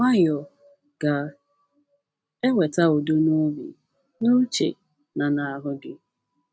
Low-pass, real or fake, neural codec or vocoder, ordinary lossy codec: none; real; none; none